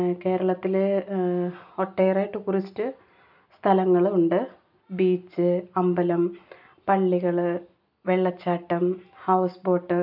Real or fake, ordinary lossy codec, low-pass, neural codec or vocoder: real; none; 5.4 kHz; none